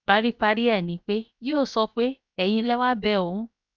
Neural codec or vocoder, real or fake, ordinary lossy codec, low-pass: codec, 16 kHz, 0.7 kbps, FocalCodec; fake; none; 7.2 kHz